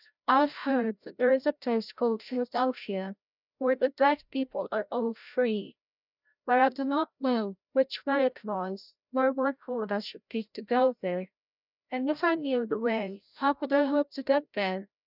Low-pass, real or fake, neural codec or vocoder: 5.4 kHz; fake; codec, 16 kHz, 0.5 kbps, FreqCodec, larger model